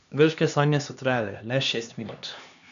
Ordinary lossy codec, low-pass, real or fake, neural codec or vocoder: none; 7.2 kHz; fake; codec, 16 kHz, 2 kbps, X-Codec, HuBERT features, trained on LibriSpeech